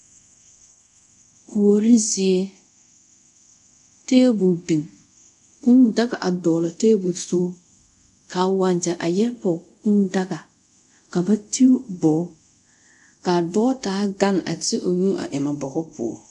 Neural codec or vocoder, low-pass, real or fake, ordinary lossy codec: codec, 24 kHz, 0.5 kbps, DualCodec; 10.8 kHz; fake; MP3, 96 kbps